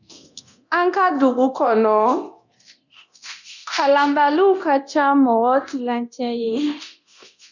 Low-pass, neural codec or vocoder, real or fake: 7.2 kHz; codec, 24 kHz, 0.9 kbps, DualCodec; fake